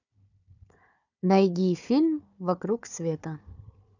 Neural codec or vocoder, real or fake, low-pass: codec, 16 kHz, 4 kbps, FunCodec, trained on Chinese and English, 50 frames a second; fake; 7.2 kHz